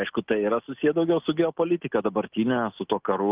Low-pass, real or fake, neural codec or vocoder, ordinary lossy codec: 3.6 kHz; real; none; Opus, 32 kbps